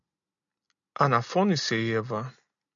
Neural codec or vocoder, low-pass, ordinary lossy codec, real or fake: none; 7.2 kHz; MP3, 96 kbps; real